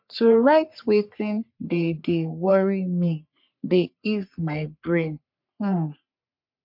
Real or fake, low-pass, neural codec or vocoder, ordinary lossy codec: fake; 5.4 kHz; codec, 44.1 kHz, 3.4 kbps, Pupu-Codec; MP3, 48 kbps